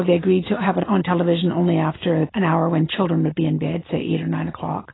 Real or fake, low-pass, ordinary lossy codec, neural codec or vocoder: real; 7.2 kHz; AAC, 16 kbps; none